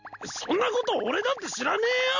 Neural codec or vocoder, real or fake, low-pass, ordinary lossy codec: none; real; 7.2 kHz; none